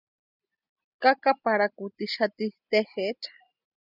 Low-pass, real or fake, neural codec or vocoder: 5.4 kHz; real; none